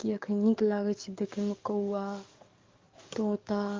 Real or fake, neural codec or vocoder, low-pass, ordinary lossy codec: fake; codec, 44.1 kHz, 7.8 kbps, DAC; 7.2 kHz; Opus, 24 kbps